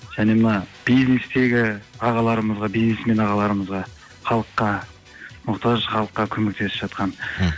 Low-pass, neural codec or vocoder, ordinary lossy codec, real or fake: none; none; none; real